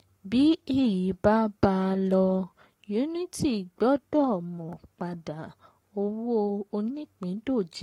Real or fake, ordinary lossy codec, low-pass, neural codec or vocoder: fake; AAC, 48 kbps; 19.8 kHz; codec, 44.1 kHz, 7.8 kbps, Pupu-Codec